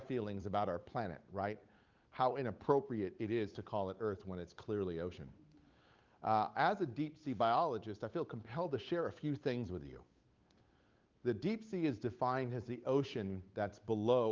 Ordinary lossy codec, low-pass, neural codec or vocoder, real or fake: Opus, 32 kbps; 7.2 kHz; codec, 16 kHz, 8 kbps, FunCodec, trained on Chinese and English, 25 frames a second; fake